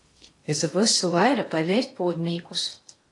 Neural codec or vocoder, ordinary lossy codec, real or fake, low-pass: codec, 16 kHz in and 24 kHz out, 0.8 kbps, FocalCodec, streaming, 65536 codes; AAC, 48 kbps; fake; 10.8 kHz